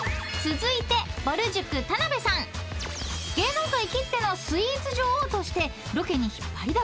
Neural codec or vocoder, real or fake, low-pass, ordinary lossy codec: none; real; none; none